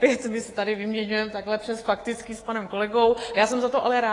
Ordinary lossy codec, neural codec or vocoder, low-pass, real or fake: AAC, 32 kbps; codec, 24 kHz, 3.1 kbps, DualCodec; 10.8 kHz; fake